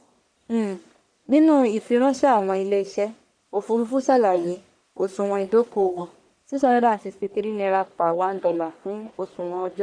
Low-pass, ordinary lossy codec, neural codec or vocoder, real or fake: 9.9 kHz; none; codec, 44.1 kHz, 1.7 kbps, Pupu-Codec; fake